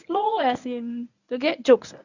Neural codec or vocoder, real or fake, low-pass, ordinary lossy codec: codec, 24 kHz, 0.9 kbps, WavTokenizer, medium speech release version 1; fake; 7.2 kHz; none